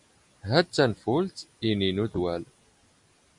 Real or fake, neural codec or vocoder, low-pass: real; none; 10.8 kHz